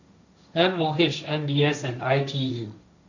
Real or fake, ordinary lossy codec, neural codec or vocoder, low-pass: fake; none; codec, 16 kHz, 1.1 kbps, Voila-Tokenizer; 7.2 kHz